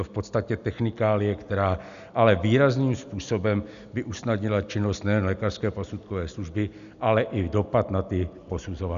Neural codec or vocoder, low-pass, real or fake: none; 7.2 kHz; real